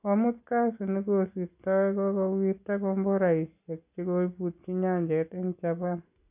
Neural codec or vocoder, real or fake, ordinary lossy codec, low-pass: none; real; AAC, 32 kbps; 3.6 kHz